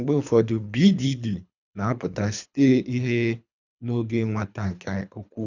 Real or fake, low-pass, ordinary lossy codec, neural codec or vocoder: fake; 7.2 kHz; none; codec, 24 kHz, 3 kbps, HILCodec